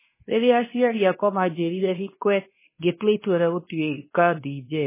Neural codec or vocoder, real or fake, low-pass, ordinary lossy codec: codec, 24 kHz, 0.9 kbps, WavTokenizer, medium speech release version 2; fake; 3.6 kHz; MP3, 16 kbps